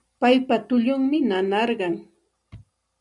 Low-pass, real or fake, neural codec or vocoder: 10.8 kHz; real; none